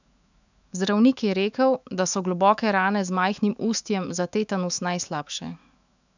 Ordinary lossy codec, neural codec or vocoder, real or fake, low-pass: none; autoencoder, 48 kHz, 128 numbers a frame, DAC-VAE, trained on Japanese speech; fake; 7.2 kHz